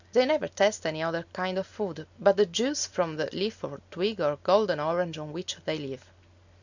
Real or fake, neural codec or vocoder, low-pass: real; none; 7.2 kHz